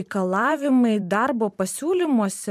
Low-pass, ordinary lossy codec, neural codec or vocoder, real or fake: 14.4 kHz; AAC, 96 kbps; vocoder, 44.1 kHz, 128 mel bands every 512 samples, BigVGAN v2; fake